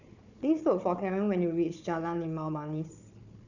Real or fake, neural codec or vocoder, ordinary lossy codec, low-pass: fake; codec, 16 kHz, 4 kbps, FunCodec, trained on Chinese and English, 50 frames a second; none; 7.2 kHz